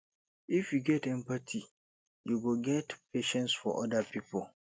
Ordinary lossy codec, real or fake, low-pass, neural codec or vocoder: none; real; none; none